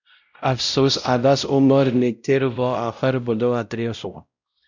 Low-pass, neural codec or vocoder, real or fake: 7.2 kHz; codec, 16 kHz, 0.5 kbps, X-Codec, WavLM features, trained on Multilingual LibriSpeech; fake